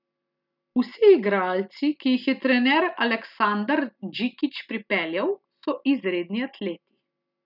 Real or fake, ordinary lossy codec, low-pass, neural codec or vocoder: real; none; 5.4 kHz; none